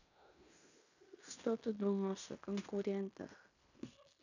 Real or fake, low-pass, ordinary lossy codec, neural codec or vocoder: fake; 7.2 kHz; none; codec, 16 kHz in and 24 kHz out, 0.9 kbps, LongCat-Audio-Codec, fine tuned four codebook decoder